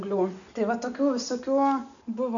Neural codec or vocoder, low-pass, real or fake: none; 7.2 kHz; real